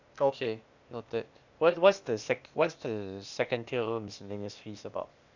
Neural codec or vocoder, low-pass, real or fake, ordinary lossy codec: codec, 16 kHz, 0.8 kbps, ZipCodec; 7.2 kHz; fake; none